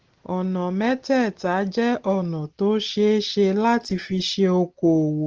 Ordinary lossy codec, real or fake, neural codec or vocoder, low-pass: Opus, 16 kbps; real; none; 7.2 kHz